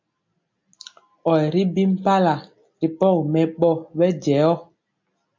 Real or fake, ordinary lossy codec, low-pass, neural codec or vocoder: real; MP3, 48 kbps; 7.2 kHz; none